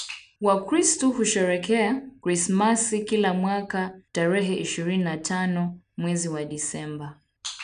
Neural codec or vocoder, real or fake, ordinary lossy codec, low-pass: none; real; none; 9.9 kHz